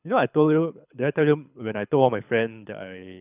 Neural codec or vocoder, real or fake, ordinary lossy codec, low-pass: codec, 24 kHz, 6 kbps, HILCodec; fake; none; 3.6 kHz